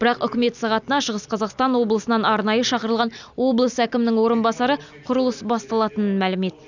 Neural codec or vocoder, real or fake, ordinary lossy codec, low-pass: none; real; none; 7.2 kHz